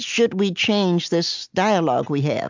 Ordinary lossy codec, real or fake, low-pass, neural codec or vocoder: MP3, 64 kbps; real; 7.2 kHz; none